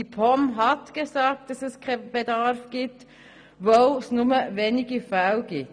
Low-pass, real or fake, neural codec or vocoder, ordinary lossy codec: 9.9 kHz; real; none; none